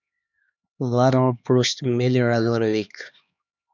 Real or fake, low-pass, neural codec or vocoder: fake; 7.2 kHz; codec, 16 kHz, 2 kbps, X-Codec, HuBERT features, trained on LibriSpeech